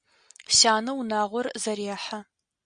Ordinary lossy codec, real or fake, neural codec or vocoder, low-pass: Opus, 64 kbps; real; none; 9.9 kHz